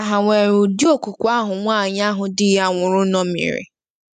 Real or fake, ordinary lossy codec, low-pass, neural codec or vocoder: real; none; 14.4 kHz; none